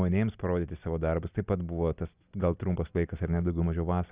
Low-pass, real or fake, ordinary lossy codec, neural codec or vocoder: 3.6 kHz; real; Opus, 64 kbps; none